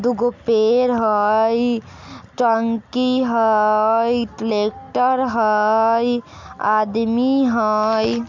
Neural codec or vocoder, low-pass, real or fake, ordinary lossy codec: none; 7.2 kHz; real; MP3, 64 kbps